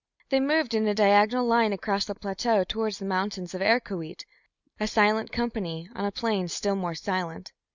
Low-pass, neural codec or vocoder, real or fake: 7.2 kHz; none; real